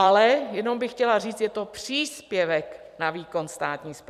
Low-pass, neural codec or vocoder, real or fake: 14.4 kHz; vocoder, 44.1 kHz, 128 mel bands every 256 samples, BigVGAN v2; fake